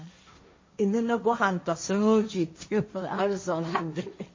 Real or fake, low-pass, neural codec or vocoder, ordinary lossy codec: fake; 7.2 kHz; codec, 16 kHz, 1.1 kbps, Voila-Tokenizer; MP3, 32 kbps